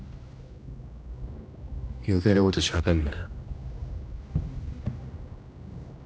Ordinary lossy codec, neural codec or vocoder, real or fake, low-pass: none; codec, 16 kHz, 1 kbps, X-Codec, HuBERT features, trained on general audio; fake; none